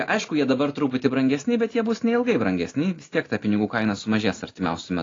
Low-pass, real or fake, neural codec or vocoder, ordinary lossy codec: 7.2 kHz; real; none; AAC, 32 kbps